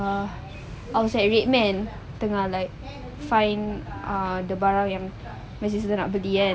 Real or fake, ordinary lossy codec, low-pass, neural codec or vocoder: real; none; none; none